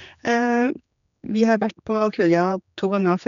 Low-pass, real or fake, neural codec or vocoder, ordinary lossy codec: 7.2 kHz; fake; codec, 16 kHz, 2 kbps, X-Codec, HuBERT features, trained on general audio; none